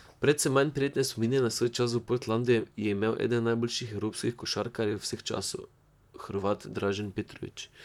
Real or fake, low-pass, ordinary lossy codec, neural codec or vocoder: fake; 19.8 kHz; none; vocoder, 44.1 kHz, 128 mel bands, Pupu-Vocoder